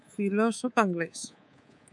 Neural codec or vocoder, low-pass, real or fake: codec, 24 kHz, 3.1 kbps, DualCodec; 10.8 kHz; fake